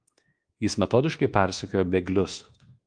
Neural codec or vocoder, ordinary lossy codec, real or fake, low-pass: codec, 24 kHz, 1.2 kbps, DualCodec; Opus, 24 kbps; fake; 9.9 kHz